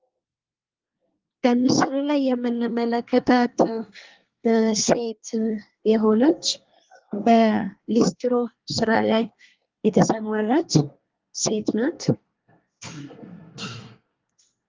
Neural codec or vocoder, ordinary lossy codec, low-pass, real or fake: codec, 24 kHz, 1 kbps, SNAC; Opus, 32 kbps; 7.2 kHz; fake